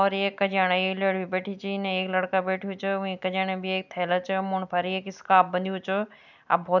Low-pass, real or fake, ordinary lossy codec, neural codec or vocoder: 7.2 kHz; real; none; none